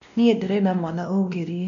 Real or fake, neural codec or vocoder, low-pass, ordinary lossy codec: fake; codec, 16 kHz, 1 kbps, X-Codec, WavLM features, trained on Multilingual LibriSpeech; 7.2 kHz; none